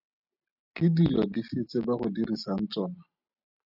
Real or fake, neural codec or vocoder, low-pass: real; none; 5.4 kHz